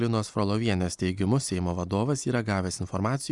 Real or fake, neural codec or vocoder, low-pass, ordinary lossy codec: real; none; 10.8 kHz; Opus, 64 kbps